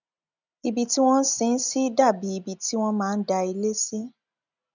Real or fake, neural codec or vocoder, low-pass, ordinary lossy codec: real; none; 7.2 kHz; none